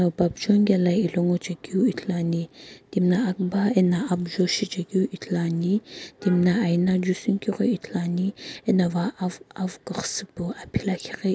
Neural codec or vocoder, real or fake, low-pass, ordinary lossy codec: none; real; none; none